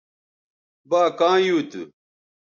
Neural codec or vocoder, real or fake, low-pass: none; real; 7.2 kHz